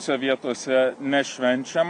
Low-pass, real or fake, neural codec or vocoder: 9.9 kHz; real; none